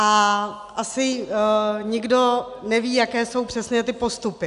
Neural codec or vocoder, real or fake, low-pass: none; real; 10.8 kHz